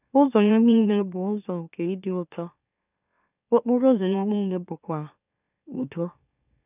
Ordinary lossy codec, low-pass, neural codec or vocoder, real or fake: none; 3.6 kHz; autoencoder, 44.1 kHz, a latent of 192 numbers a frame, MeloTTS; fake